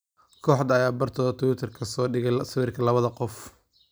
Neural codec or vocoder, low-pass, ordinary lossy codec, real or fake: none; none; none; real